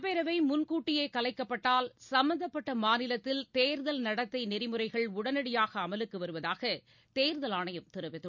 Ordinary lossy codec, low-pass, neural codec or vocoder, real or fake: none; 7.2 kHz; none; real